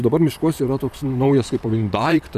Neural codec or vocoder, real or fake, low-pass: vocoder, 44.1 kHz, 128 mel bands, Pupu-Vocoder; fake; 14.4 kHz